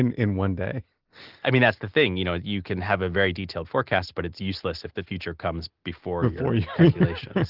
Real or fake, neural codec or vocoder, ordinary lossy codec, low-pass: real; none; Opus, 16 kbps; 5.4 kHz